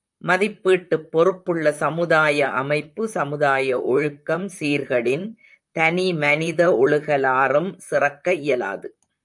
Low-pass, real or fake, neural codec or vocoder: 10.8 kHz; fake; vocoder, 44.1 kHz, 128 mel bands, Pupu-Vocoder